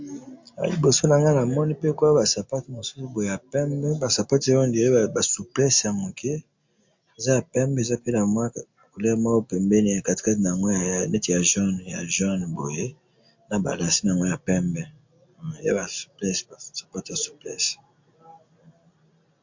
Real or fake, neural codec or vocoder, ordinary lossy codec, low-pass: real; none; MP3, 48 kbps; 7.2 kHz